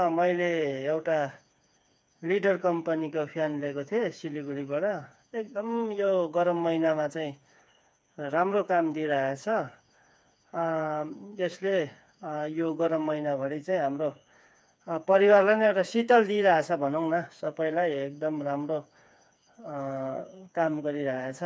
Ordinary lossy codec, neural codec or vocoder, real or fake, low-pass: none; codec, 16 kHz, 4 kbps, FreqCodec, smaller model; fake; none